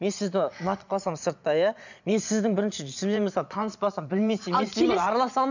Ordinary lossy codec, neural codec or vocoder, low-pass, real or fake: none; vocoder, 22.05 kHz, 80 mel bands, Vocos; 7.2 kHz; fake